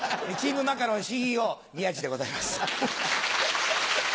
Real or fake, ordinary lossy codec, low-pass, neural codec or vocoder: real; none; none; none